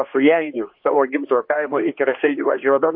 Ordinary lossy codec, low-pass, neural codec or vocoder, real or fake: MP3, 32 kbps; 5.4 kHz; codec, 16 kHz, 2 kbps, X-Codec, HuBERT features, trained on general audio; fake